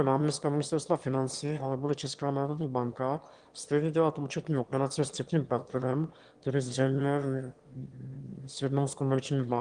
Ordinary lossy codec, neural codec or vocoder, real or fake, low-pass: Opus, 24 kbps; autoencoder, 22.05 kHz, a latent of 192 numbers a frame, VITS, trained on one speaker; fake; 9.9 kHz